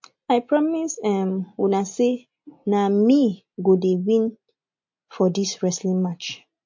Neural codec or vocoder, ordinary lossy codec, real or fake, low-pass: none; MP3, 48 kbps; real; 7.2 kHz